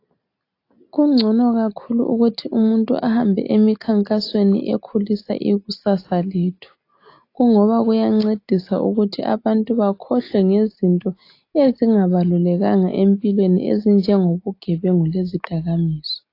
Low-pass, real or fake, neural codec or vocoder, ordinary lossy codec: 5.4 kHz; real; none; AAC, 32 kbps